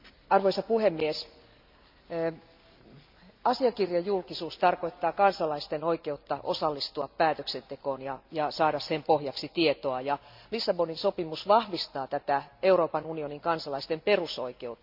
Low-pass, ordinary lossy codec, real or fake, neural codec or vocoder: 5.4 kHz; MP3, 48 kbps; real; none